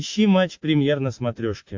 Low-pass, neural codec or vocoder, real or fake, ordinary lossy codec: 7.2 kHz; none; real; MP3, 48 kbps